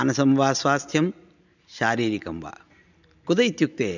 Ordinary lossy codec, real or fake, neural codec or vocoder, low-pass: none; real; none; 7.2 kHz